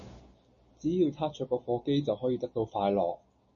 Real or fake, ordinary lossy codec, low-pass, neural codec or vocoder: real; MP3, 32 kbps; 7.2 kHz; none